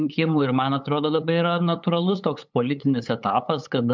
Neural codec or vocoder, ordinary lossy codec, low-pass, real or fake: codec, 16 kHz, 8 kbps, FunCodec, trained on LibriTTS, 25 frames a second; MP3, 64 kbps; 7.2 kHz; fake